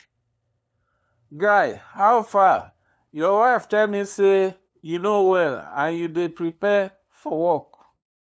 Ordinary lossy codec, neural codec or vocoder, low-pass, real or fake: none; codec, 16 kHz, 2 kbps, FunCodec, trained on LibriTTS, 25 frames a second; none; fake